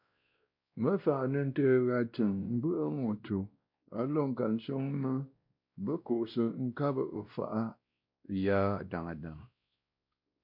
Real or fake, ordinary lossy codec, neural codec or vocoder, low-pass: fake; MP3, 48 kbps; codec, 16 kHz, 1 kbps, X-Codec, WavLM features, trained on Multilingual LibriSpeech; 5.4 kHz